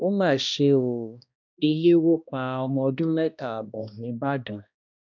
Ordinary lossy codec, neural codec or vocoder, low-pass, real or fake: none; codec, 16 kHz, 1 kbps, X-Codec, HuBERT features, trained on balanced general audio; 7.2 kHz; fake